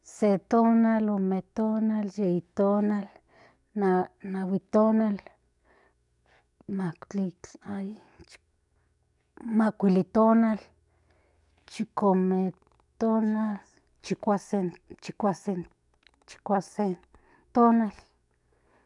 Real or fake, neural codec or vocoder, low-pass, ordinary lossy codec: fake; codec, 44.1 kHz, 7.8 kbps, DAC; 10.8 kHz; none